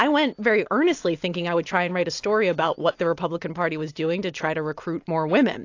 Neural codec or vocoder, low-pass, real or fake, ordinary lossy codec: none; 7.2 kHz; real; AAC, 48 kbps